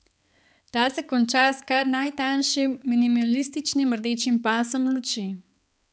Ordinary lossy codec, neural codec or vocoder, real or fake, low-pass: none; codec, 16 kHz, 4 kbps, X-Codec, HuBERT features, trained on balanced general audio; fake; none